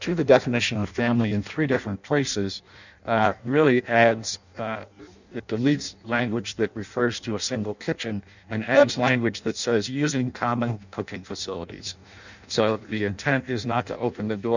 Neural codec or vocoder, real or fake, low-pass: codec, 16 kHz in and 24 kHz out, 0.6 kbps, FireRedTTS-2 codec; fake; 7.2 kHz